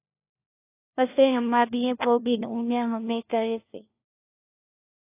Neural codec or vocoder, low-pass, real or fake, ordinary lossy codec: codec, 16 kHz, 1 kbps, FunCodec, trained on LibriTTS, 50 frames a second; 3.6 kHz; fake; AAC, 24 kbps